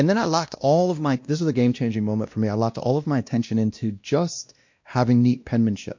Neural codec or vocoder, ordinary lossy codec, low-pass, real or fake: codec, 16 kHz, 1 kbps, X-Codec, WavLM features, trained on Multilingual LibriSpeech; MP3, 48 kbps; 7.2 kHz; fake